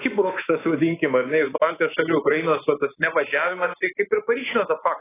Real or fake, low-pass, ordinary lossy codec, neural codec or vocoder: fake; 3.6 kHz; AAC, 16 kbps; codec, 24 kHz, 3.1 kbps, DualCodec